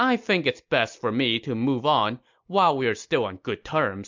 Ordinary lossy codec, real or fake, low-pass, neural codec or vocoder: MP3, 64 kbps; real; 7.2 kHz; none